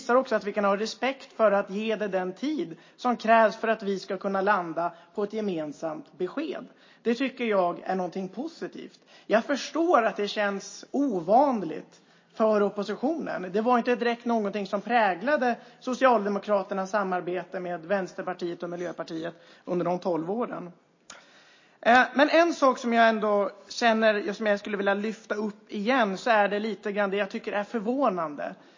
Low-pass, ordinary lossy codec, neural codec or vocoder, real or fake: 7.2 kHz; MP3, 32 kbps; none; real